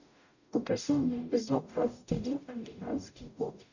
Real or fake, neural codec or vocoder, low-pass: fake; codec, 44.1 kHz, 0.9 kbps, DAC; 7.2 kHz